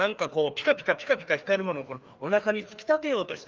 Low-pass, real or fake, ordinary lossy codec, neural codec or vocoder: 7.2 kHz; fake; Opus, 32 kbps; codec, 16 kHz, 2 kbps, FreqCodec, larger model